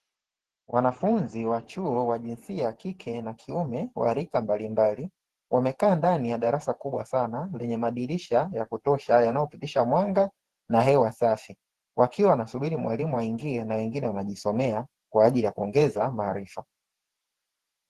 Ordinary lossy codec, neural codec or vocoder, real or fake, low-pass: Opus, 16 kbps; vocoder, 48 kHz, 128 mel bands, Vocos; fake; 14.4 kHz